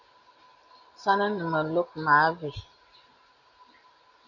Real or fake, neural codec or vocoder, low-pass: real; none; 7.2 kHz